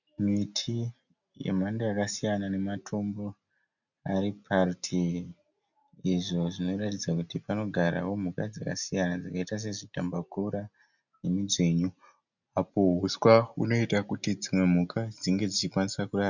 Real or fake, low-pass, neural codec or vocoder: real; 7.2 kHz; none